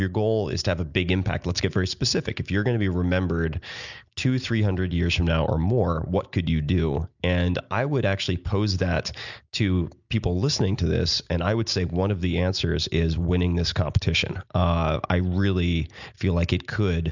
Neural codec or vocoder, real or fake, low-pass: none; real; 7.2 kHz